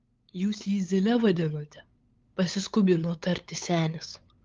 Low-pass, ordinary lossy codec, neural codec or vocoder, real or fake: 7.2 kHz; Opus, 32 kbps; codec, 16 kHz, 8 kbps, FunCodec, trained on LibriTTS, 25 frames a second; fake